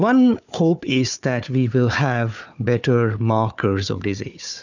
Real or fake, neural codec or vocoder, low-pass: fake; codec, 16 kHz, 4 kbps, FunCodec, trained on Chinese and English, 50 frames a second; 7.2 kHz